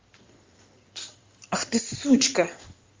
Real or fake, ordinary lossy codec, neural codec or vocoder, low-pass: fake; Opus, 32 kbps; codec, 16 kHz in and 24 kHz out, 2.2 kbps, FireRedTTS-2 codec; 7.2 kHz